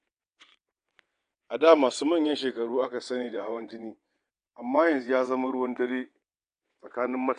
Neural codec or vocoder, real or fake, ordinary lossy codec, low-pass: vocoder, 22.05 kHz, 80 mel bands, WaveNeXt; fake; AAC, 64 kbps; 9.9 kHz